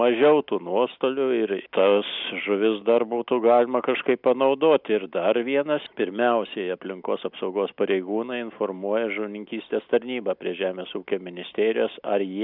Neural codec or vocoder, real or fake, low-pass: none; real; 5.4 kHz